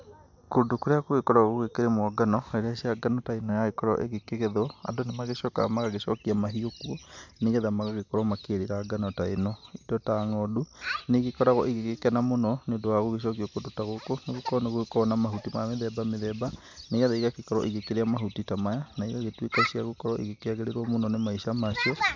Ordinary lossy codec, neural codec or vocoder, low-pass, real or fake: MP3, 64 kbps; none; 7.2 kHz; real